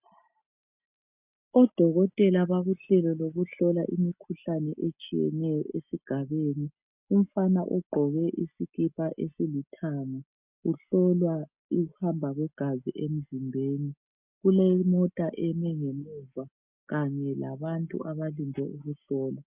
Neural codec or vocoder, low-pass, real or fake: none; 3.6 kHz; real